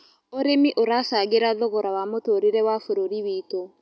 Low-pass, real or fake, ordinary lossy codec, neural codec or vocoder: none; real; none; none